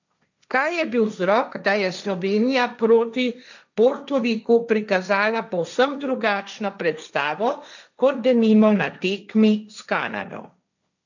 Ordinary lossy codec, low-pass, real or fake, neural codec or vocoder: none; 7.2 kHz; fake; codec, 16 kHz, 1.1 kbps, Voila-Tokenizer